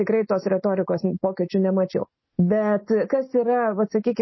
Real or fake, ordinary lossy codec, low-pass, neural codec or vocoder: real; MP3, 24 kbps; 7.2 kHz; none